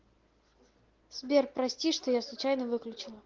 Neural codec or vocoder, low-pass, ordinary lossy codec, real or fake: none; 7.2 kHz; Opus, 16 kbps; real